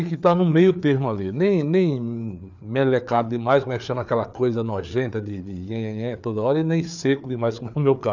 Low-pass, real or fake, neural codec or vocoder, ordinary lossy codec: 7.2 kHz; fake; codec, 16 kHz, 4 kbps, FreqCodec, larger model; none